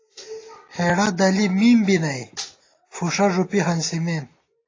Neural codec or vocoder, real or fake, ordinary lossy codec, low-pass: none; real; AAC, 32 kbps; 7.2 kHz